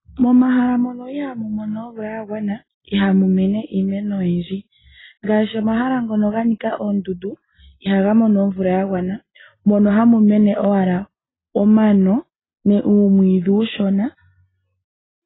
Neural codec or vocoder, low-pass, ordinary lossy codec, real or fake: none; 7.2 kHz; AAC, 16 kbps; real